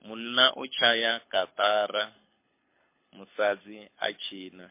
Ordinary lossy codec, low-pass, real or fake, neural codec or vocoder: MP3, 24 kbps; 3.6 kHz; fake; codec, 24 kHz, 6 kbps, HILCodec